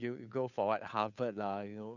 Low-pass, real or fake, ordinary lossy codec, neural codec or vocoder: 7.2 kHz; fake; none; codec, 16 kHz, 2 kbps, FunCodec, trained on Chinese and English, 25 frames a second